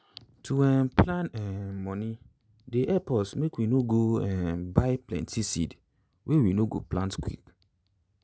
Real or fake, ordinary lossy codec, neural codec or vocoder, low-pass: real; none; none; none